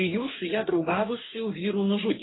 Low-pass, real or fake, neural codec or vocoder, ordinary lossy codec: 7.2 kHz; fake; codec, 44.1 kHz, 2.6 kbps, DAC; AAC, 16 kbps